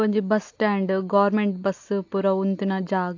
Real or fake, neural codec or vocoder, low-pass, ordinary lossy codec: real; none; 7.2 kHz; AAC, 48 kbps